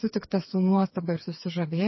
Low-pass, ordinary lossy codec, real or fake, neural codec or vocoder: 7.2 kHz; MP3, 24 kbps; fake; codec, 16 kHz, 4 kbps, FreqCodec, smaller model